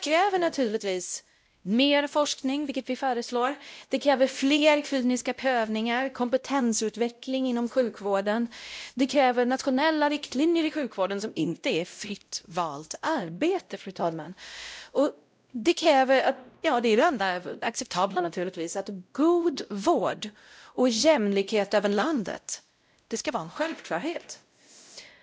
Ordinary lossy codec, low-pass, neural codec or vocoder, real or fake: none; none; codec, 16 kHz, 0.5 kbps, X-Codec, WavLM features, trained on Multilingual LibriSpeech; fake